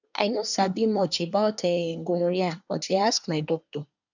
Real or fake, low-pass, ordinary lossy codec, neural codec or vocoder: fake; 7.2 kHz; none; codec, 24 kHz, 1 kbps, SNAC